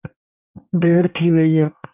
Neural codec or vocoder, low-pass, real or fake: codec, 24 kHz, 1 kbps, SNAC; 3.6 kHz; fake